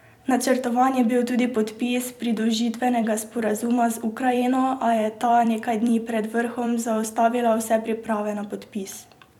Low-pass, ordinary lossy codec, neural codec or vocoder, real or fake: 19.8 kHz; none; vocoder, 44.1 kHz, 128 mel bands every 256 samples, BigVGAN v2; fake